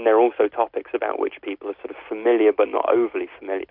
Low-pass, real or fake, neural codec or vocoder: 5.4 kHz; real; none